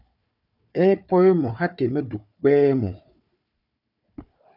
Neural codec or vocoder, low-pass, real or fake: codec, 16 kHz, 4 kbps, FunCodec, trained on Chinese and English, 50 frames a second; 5.4 kHz; fake